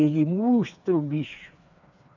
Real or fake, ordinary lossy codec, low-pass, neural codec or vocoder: fake; none; 7.2 kHz; codec, 16 kHz, 4 kbps, FreqCodec, smaller model